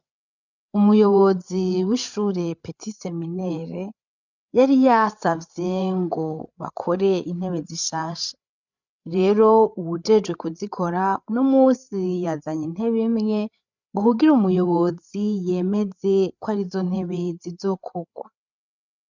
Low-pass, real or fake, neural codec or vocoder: 7.2 kHz; fake; codec, 16 kHz, 8 kbps, FreqCodec, larger model